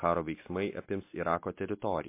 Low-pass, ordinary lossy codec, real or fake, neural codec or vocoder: 3.6 kHz; MP3, 24 kbps; real; none